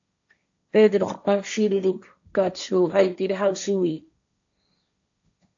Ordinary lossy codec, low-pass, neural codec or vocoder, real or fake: AAC, 64 kbps; 7.2 kHz; codec, 16 kHz, 1.1 kbps, Voila-Tokenizer; fake